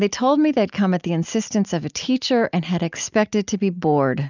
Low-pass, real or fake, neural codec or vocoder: 7.2 kHz; real; none